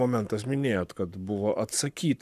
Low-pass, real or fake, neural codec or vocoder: 14.4 kHz; fake; codec, 44.1 kHz, 7.8 kbps, Pupu-Codec